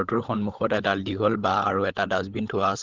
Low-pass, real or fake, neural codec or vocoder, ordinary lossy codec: 7.2 kHz; fake; codec, 16 kHz, 16 kbps, FunCodec, trained on LibriTTS, 50 frames a second; Opus, 16 kbps